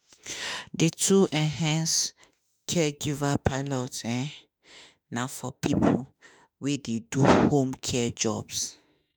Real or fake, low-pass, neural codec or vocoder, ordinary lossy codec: fake; none; autoencoder, 48 kHz, 32 numbers a frame, DAC-VAE, trained on Japanese speech; none